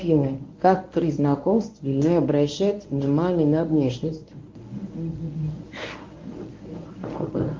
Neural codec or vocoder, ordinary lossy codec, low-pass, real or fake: codec, 24 kHz, 0.9 kbps, WavTokenizer, medium speech release version 1; Opus, 32 kbps; 7.2 kHz; fake